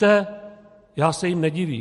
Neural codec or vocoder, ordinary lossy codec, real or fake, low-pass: none; MP3, 48 kbps; real; 14.4 kHz